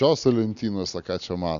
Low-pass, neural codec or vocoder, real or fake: 7.2 kHz; none; real